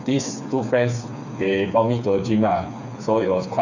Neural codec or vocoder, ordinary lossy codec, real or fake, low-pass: codec, 16 kHz, 4 kbps, FreqCodec, smaller model; none; fake; 7.2 kHz